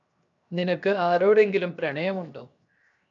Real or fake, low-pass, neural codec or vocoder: fake; 7.2 kHz; codec, 16 kHz, 0.7 kbps, FocalCodec